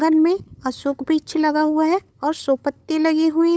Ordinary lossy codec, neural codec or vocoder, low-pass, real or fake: none; codec, 16 kHz, 8 kbps, FunCodec, trained on LibriTTS, 25 frames a second; none; fake